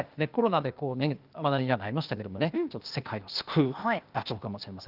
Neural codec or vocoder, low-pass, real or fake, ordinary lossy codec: codec, 16 kHz, 0.8 kbps, ZipCodec; 5.4 kHz; fake; Opus, 32 kbps